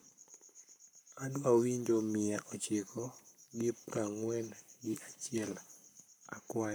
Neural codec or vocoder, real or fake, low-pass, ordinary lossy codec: codec, 44.1 kHz, 7.8 kbps, Pupu-Codec; fake; none; none